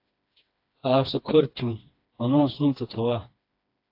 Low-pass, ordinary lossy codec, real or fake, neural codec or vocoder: 5.4 kHz; AAC, 32 kbps; fake; codec, 16 kHz, 2 kbps, FreqCodec, smaller model